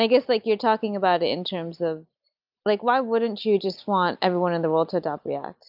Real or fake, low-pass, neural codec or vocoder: real; 5.4 kHz; none